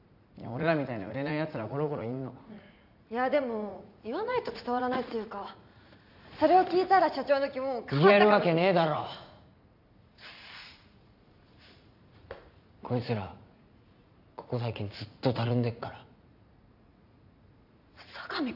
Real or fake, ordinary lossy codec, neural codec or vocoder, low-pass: fake; none; vocoder, 44.1 kHz, 80 mel bands, Vocos; 5.4 kHz